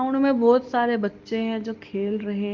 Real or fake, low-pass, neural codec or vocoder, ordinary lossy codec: real; 7.2 kHz; none; Opus, 32 kbps